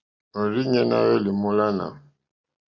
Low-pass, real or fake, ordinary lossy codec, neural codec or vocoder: 7.2 kHz; real; Opus, 64 kbps; none